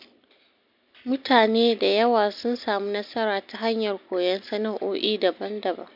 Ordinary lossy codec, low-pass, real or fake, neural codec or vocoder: MP3, 48 kbps; 5.4 kHz; real; none